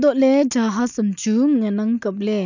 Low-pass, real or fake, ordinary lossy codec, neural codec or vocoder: 7.2 kHz; real; none; none